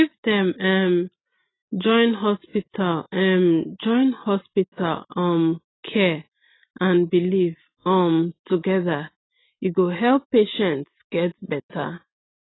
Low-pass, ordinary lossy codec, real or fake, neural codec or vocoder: 7.2 kHz; AAC, 16 kbps; real; none